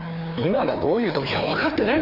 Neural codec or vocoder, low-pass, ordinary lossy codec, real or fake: codec, 16 kHz, 2 kbps, FreqCodec, larger model; 5.4 kHz; AAC, 32 kbps; fake